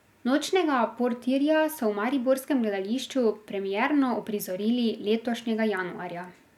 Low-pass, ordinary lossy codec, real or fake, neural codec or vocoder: 19.8 kHz; none; real; none